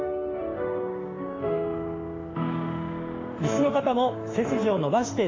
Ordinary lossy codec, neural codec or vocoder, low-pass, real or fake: AAC, 32 kbps; autoencoder, 48 kHz, 32 numbers a frame, DAC-VAE, trained on Japanese speech; 7.2 kHz; fake